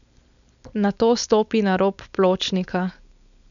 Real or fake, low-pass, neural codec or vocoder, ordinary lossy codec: fake; 7.2 kHz; codec, 16 kHz, 4.8 kbps, FACodec; none